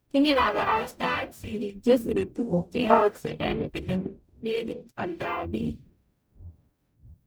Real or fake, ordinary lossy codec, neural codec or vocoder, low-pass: fake; none; codec, 44.1 kHz, 0.9 kbps, DAC; none